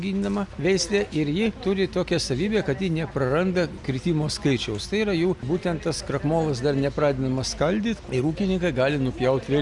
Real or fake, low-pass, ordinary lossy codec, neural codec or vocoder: real; 10.8 kHz; Opus, 64 kbps; none